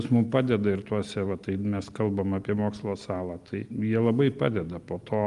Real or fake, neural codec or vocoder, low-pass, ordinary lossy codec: real; none; 10.8 kHz; Opus, 32 kbps